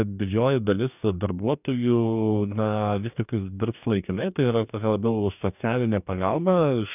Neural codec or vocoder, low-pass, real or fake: codec, 44.1 kHz, 2.6 kbps, DAC; 3.6 kHz; fake